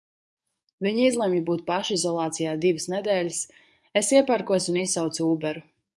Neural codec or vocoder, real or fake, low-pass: codec, 44.1 kHz, 7.8 kbps, DAC; fake; 10.8 kHz